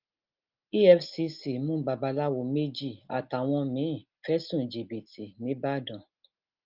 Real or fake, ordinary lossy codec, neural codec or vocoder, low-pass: real; Opus, 24 kbps; none; 5.4 kHz